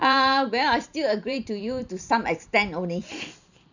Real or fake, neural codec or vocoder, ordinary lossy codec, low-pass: fake; vocoder, 44.1 kHz, 128 mel bands every 512 samples, BigVGAN v2; none; 7.2 kHz